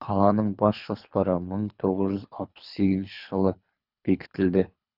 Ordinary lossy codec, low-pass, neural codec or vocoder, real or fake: none; 5.4 kHz; codec, 24 kHz, 3 kbps, HILCodec; fake